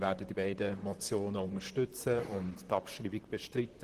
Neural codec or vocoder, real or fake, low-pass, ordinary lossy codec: vocoder, 44.1 kHz, 128 mel bands, Pupu-Vocoder; fake; 14.4 kHz; Opus, 16 kbps